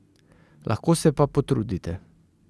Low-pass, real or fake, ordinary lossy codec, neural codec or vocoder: none; real; none; none